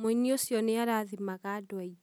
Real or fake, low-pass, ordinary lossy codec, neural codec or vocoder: real; none; none; none